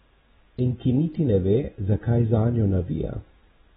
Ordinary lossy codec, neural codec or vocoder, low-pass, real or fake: AAC, 16 kbps; vocoder, 44.1 kHz, 128 mel bands every 256 samples, BigVGAN v2; 19.8 kHz; fake